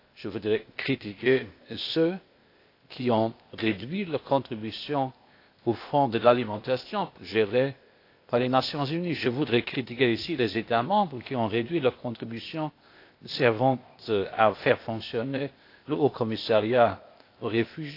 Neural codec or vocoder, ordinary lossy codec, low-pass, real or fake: codec, 16 kHz, 0.8 kbps, ZipCodec; AAC, 32 kbps; 5.4 kHz; fake